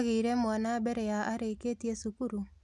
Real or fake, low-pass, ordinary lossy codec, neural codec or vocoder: real; none; none; none